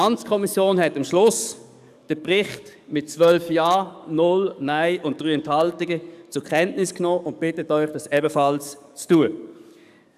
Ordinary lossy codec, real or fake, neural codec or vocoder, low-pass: none; fake; codec, 44.1 kHz, 7.8 kbps, DAC; 14.4 kHz